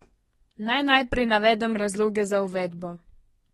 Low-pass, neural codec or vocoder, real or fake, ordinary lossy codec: 14.4 kHz; codec, 32 kHz, 1.9 kbps, SNAC; fake; AAC, 32 kbps